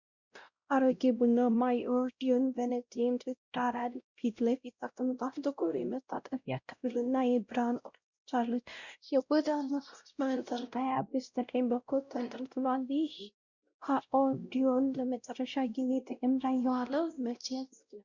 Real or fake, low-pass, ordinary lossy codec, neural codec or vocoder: fake; 7.2 kHz; Opus, 64 kbps; codec, 16 kHz, 0.5 kbps, X-Codec, WavLM features, trained on Multilingual LibriSpeech